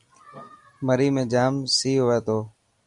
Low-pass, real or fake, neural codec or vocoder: 10.8 kHz; real; none